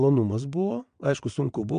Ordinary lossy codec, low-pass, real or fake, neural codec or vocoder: MP3, 64 kbps; 9.9 kHz; fake; vocoder, 22.05 kHz, 80 mel bands, WaveNeXt